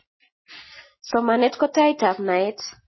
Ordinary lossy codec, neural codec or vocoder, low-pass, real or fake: MP3, 24 kbps; none; 7.2 kHz; real